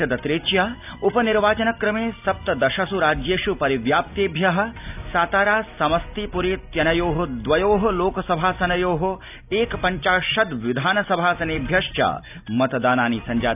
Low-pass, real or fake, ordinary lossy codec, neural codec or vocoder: 3.6 kHz; real; none; none